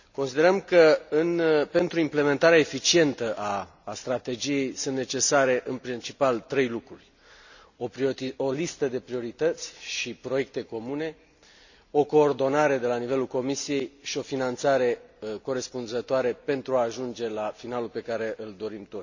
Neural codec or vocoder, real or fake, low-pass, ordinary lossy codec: none; real; 7.2 kHz; none